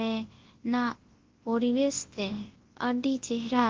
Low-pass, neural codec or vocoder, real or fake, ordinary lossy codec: 7.2 kHz; codec, 24 kHz, 0.9 kbps, WavTokenizer, large speech release; fake; Opus, 16 kbps